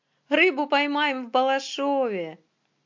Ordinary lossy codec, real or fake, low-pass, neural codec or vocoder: MP3, 48 kbps; real; 7.2 kHz; none